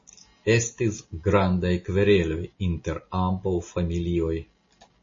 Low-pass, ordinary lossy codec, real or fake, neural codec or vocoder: 7.2 kHz; MP3, 32 kbps; real; none